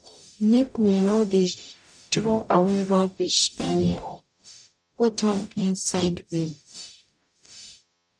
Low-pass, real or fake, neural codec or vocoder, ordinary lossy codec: 9.9 kHz; fake; codec, 44.1 kHz, 0.9 kbps, DAC; none